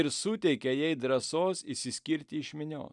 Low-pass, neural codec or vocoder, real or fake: 10.8 kHz; none; real